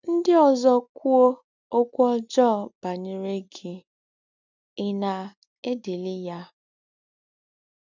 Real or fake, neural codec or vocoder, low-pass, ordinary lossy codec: real; none; 7.2 kHz; none